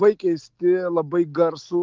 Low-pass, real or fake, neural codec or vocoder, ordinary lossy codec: 7.2 kHz; real; none; Opus, 16 kbps